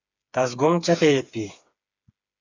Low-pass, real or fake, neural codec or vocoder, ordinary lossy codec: 7.2 kHz; fake; codec, 16 kHz, 4 kbps, FreqCodec, smaller model; AAC, 48 kbps